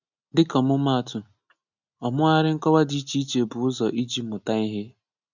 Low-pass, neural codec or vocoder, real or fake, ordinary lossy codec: 7.2 kHz; none; real; none